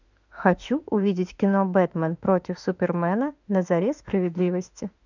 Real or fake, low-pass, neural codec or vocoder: fake; 7.2 kHz; autoencoder, 48 kHz, 32 numbers a frame, DAC-VAE, trained on Japanese speech